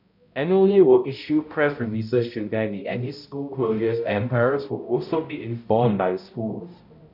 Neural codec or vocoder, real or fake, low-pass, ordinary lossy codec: codec, 16 kHz, 0.5 kbps, X-Codec, HuBERT features, trained on balanced general audio; fake; 5.4 kHz; none